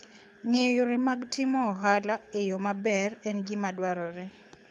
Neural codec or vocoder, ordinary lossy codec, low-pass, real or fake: codec, 24 kHz, 6 kbps, HILCodec; none; none; fake